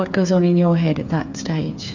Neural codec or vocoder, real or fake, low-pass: codec, 16 kHz, 8 kbps, FreqCodec, smaller model; fake; 7.2 kHz